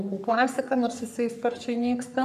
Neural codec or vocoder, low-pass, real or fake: codec, 44.1 kHz, 3.4 kbps, Pupu-Codec; 14.4 kHz; fake